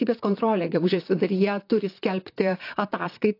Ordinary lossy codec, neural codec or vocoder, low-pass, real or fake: AAC, 32 kbps; vocoder, 44.1 kHz, 128 mel bands, Pupu-Vocoder; 5.4 kHz; fake